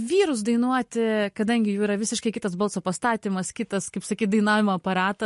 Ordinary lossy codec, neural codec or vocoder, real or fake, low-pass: MP3, 48 kbps; none; real; 14.4 kHz